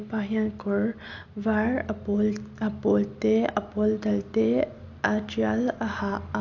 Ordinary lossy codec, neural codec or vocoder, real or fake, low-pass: AAC, 48 kbps; none; real; 7.2 kHz